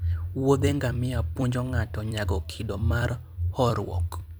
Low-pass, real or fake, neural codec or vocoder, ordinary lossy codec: none; fake; vocoder, 44.1 kHz, 128 mel bands every 256 samples, BigVGAN v2; none